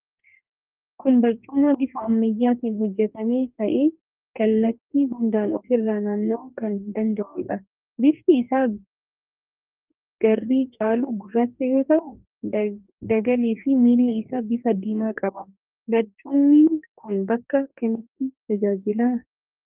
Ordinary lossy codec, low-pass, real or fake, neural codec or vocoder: Opus, 24 kbps; 3.6 kHz; fake; codec, 44.1 kHz, 2.6 kbps, DAC